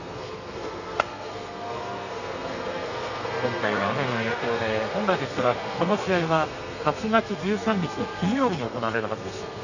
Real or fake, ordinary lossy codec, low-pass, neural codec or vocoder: fake; none; 7.2 kHz; codec, 32 kHz, 1.9 kbps, SNAC